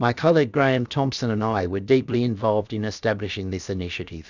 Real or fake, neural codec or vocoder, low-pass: fake; codec, 16 kHz, 0.7 kbps, FocalCodec; 7.2 kHz